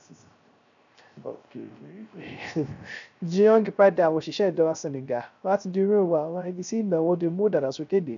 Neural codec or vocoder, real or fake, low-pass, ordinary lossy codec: codec, 16 kHz, 0.3 kbps, FocalCodec; fake; 7.2 kHz; none